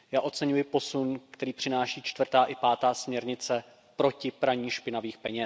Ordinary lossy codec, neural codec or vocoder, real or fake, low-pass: none; none; real; none